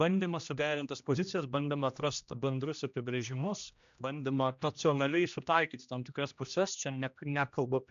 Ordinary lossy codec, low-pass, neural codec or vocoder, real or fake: MP3, 64 kbps; 7.2 kHz; codec, 16 kHz, 1 kbps, X-Codec, HuBERT features, trained on general audio; fake